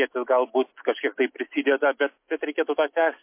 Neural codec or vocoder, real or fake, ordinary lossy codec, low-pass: none; real; MP3, 24 kbps; 3.6 kHz